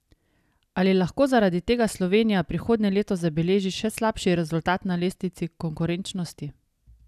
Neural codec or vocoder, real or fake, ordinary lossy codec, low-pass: none; real; none; 14.4 kHz